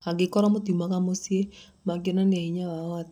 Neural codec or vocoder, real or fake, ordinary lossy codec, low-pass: none; real; none; 19.8 kHz